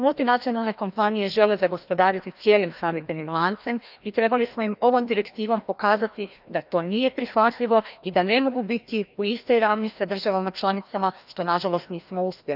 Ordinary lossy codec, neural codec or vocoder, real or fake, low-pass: none; codec, 16 kHz, 1 kbps, FreqCodec, larger model; fake; 5.4 kHz